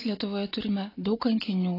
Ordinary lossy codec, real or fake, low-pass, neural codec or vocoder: AAC, 24 kbps; real; 5.4 kHz; none